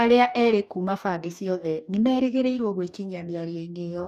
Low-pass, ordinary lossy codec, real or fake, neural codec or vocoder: 14.4 kHz; none; fake; codec, 44.1 kHz, 2.6 kbps, DAC